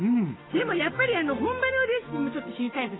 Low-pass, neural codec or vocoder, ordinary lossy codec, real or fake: 7.2 kHz; codec, 16 kHz, 6 kbps, DAC; AAC, 16 kbps; fake